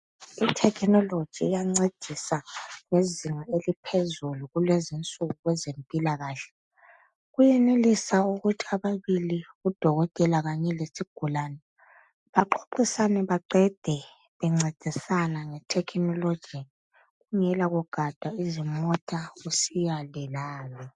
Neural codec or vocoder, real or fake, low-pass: none; real; 10.8 kHz